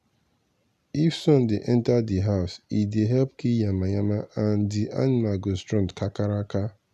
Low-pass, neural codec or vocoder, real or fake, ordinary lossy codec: 14.4 kHz; none; real; none